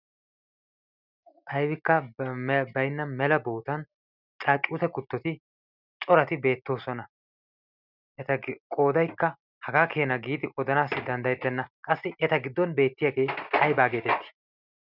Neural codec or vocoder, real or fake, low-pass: none; real; 5.4 kHz